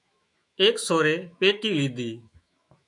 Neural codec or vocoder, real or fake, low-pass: autoencoder, 48 kHz, 128 numbers a frame, DAC-VAE, trained on Japanese speech; fake; 10.8 kHz